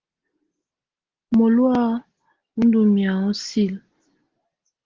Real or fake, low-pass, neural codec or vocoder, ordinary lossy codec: real; 7.2 kHz; none; Opus, 16 kbps